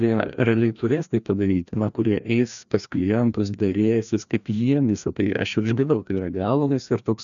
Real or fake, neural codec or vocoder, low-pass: fake; codec, 16 kHz, 1 kbps, FreqCodec, larger model; 7.2 kHz